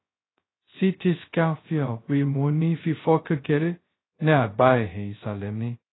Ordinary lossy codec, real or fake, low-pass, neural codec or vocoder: AAC, 16 kbps; fake; 7.2 kHz; codec, 16 kHz, 0.2 kbps, FocalCodec